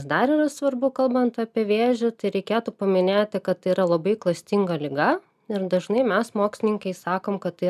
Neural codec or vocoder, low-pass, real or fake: none; 14.4 kHz; real